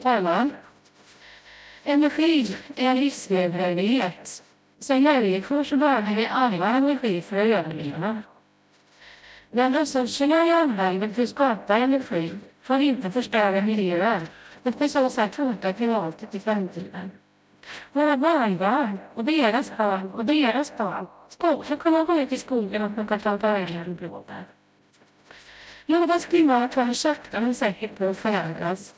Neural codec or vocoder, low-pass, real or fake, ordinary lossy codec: codec, 16 kHz, 0.5 kbps, FreqCodec, smaller model; none; fake; none